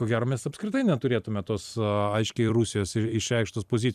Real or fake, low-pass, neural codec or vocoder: fake; 14.4 kHz; vocoder, 44.1 kHz, 128 mel bands every 256 samples, BigVGAN v2